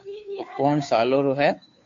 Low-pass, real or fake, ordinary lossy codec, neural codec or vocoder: 7.2 kHz; fake; AAC, 48 kbps; codec, 16 kHz, 2 kbps, FunCodec, trained on Chinese and English, 25 frames a second